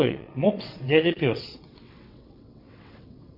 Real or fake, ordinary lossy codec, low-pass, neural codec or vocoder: fake; MP3, 48 kbps; 5.4 kHz; vocoder, 22.05 kHz, 80 mel bands, WaveNeXt